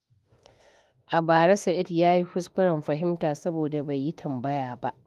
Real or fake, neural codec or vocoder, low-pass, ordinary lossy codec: fake; autoencoder, 48 kHz, 32 numbers a frame, DAC-VAE, trained on Japanese speech; 14.4 kHz; Opus, 32 kbps